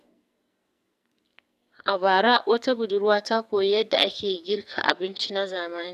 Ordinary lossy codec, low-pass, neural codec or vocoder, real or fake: none; 14.4 kHz; codec, 44.1 kHz, 2.6 kbps, SNAC; fake